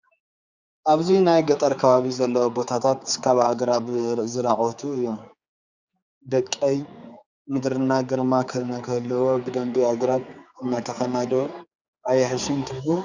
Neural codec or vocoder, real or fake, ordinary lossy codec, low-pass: codec, 16 kHz, 4 kbps, X-Codec, HuBERT features, trained on general audio; fake; Opus, 64 kbps; 7.2 kHz